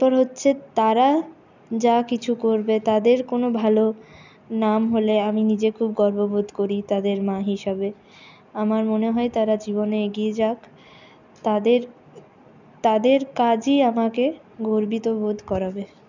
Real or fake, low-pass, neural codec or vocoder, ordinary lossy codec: real; 7.2 kHz; none; none